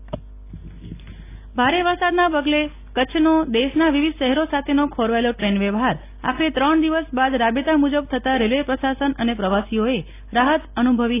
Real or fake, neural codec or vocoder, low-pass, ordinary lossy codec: real; none; 3.6 kHz; AAC, 24 kbps